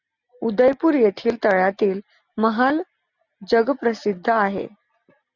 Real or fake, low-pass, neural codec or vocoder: real; 7.2 kHz; none